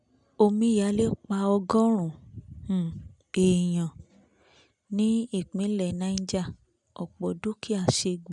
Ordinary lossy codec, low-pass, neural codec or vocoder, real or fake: none; 10.8 kHz; none; real